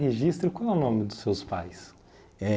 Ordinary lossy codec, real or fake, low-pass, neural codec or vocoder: none; real; none; none